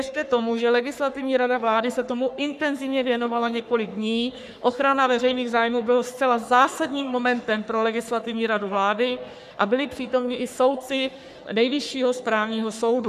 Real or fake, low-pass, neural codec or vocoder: fake; 14.4 kHz; codec, 44.1 kHz, 3.4 kbps, Pupu-Codec